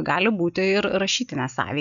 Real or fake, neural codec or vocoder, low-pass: real; none; 7.2 kHz